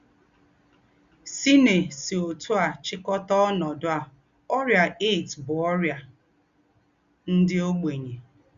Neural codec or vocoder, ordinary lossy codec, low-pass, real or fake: none; Opus, 64 kbps; 7.2 kHz; real